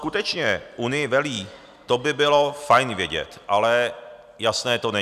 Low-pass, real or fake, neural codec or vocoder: 14.4 kHz; real; none